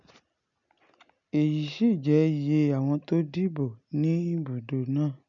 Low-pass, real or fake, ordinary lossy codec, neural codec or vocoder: 7.2 kHz; real; none; none